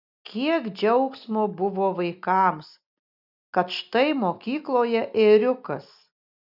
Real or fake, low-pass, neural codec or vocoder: real; 5.4 kHz; none